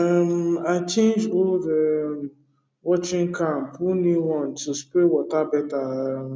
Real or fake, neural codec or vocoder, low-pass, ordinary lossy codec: real; none; none; none